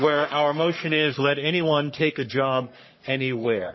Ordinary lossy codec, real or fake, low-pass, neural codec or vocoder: MP3, 24 kbps; fake; 7.2 kHz; codec, 44.1 kHz, 3.4 kbps, Pupu-Codec